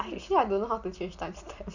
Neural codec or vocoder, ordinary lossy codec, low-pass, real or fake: none; none; 7.2 kHz; real